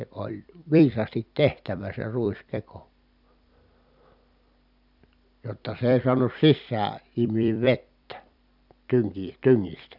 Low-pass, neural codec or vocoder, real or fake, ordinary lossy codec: 5.4 kHz; vocoder, 44.1 kHz, 128 mel bands every 256 samples, BigVGAN v2; fake; none